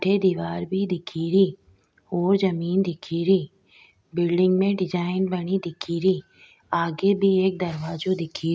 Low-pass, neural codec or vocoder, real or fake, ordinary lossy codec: none; none; real; none